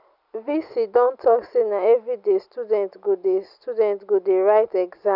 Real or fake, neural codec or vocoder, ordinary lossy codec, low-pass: real; none; none; 5.4 kHz